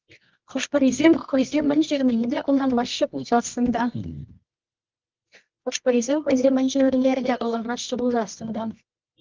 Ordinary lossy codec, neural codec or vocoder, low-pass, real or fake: Opus, 32 kbps; codec, 24 kHz, 0.9 kbps, WavTokenizer, medium music audio release; 7.2 kHz; fake